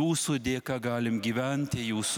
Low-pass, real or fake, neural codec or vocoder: 19.8 kHz; real; none